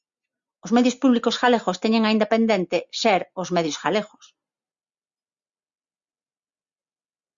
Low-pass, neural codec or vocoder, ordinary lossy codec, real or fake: 7.2 kHz; none; Opus, 64 kbps; real